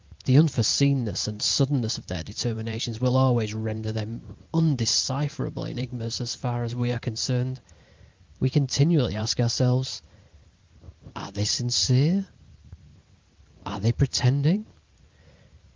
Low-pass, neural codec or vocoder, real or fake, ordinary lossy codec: 7.2 kHz; vocoder, 44.1 kHz, 128 mel bands every 512 samples, BigVGAN v2; fake; Opus, 24 kbps